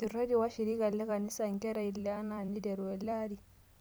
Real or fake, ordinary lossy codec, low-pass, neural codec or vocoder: fake; none; none; vocoder, 44.1 kHz, 128 mel bands every 256 samples, BigVGAN v2